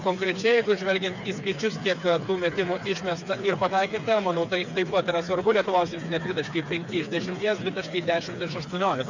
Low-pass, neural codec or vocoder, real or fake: 7.2 kHz; codec, 16 kHz, 4 kbps, FreqCodec, smaller model; fake